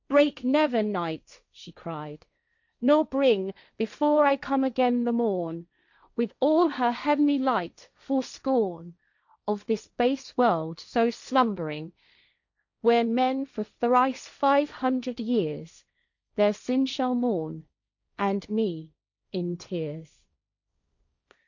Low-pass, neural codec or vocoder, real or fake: 7.2 kHz; codec, 16 kHz, 1.1 kbps, Voila-Tokenizer; fake